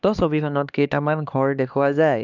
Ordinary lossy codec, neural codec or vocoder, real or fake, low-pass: none; codec, 16 kHz, 2 kbps, X-Codec, HuBERT features, trained on LibriSpeech; fake; 7.2 kHz